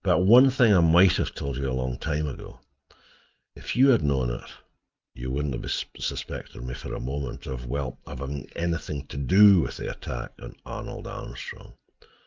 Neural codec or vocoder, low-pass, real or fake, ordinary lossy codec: none; 7.2 kHz; real; Opus, 32 kbps